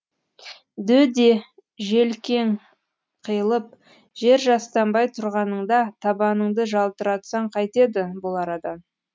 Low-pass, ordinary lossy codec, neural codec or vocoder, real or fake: none; none; none; real